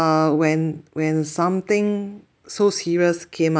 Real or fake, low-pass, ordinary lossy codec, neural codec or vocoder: real; none; none; none